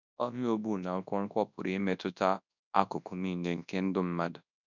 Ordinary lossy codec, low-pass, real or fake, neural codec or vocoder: none; 7.2 kHz; fake; codec, 24 kHz, 0.9 kbps, WavTokenizer, large speech release